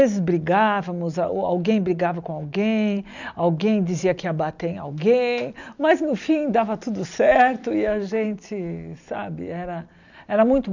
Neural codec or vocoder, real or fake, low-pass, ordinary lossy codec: none; real; 7.2 kHz; none